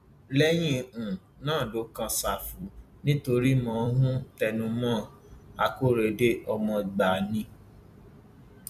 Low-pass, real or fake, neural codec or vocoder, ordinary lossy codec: 14.4 kHz; fake; vocoder, 44.1 kHz, 128 mel bands every 256 samples, BigVGAN v2; none